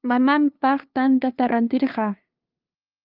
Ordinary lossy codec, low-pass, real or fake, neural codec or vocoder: Opus, 24 kbps; 5.4 kHz; fake; codec, 16 kHz, 2 kbps, FunCodec, trained on LibriTTS, 25 frames a second